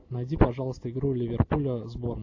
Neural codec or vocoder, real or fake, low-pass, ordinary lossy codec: none; real; 7.2 kHz; AAC, 48 kbps